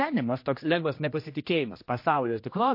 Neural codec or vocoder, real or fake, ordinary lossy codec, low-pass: codec, 16 kHz, 1 kbps, X-Codec, HuBERT features, trained on general audio; fake; MP3, 32 kbps; 5.4 kHz